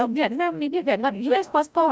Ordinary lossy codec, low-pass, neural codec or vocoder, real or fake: none; none; codec, 16 kHz, 0.5 kbps, FreqCodec, larger model; fake